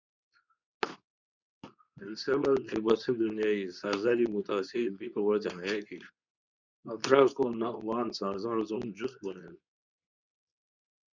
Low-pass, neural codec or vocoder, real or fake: 7.2 kHz; codec, 24 kHz, 0.9 kbps, WavTokenizer, medium speech release version 2; fake